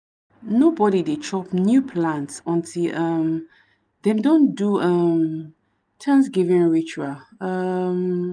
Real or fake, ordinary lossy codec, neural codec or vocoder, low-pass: real; none; none; 9.9 kHz